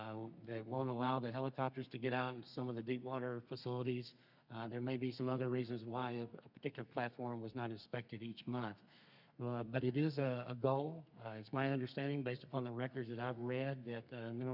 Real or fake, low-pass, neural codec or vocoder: fake; 5.4 kHz; codec, 32 kHz, 1.9 kbps, SNAC